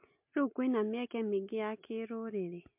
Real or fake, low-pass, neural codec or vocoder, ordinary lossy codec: real; 3.6 kHz; none; MP3, 32 kbps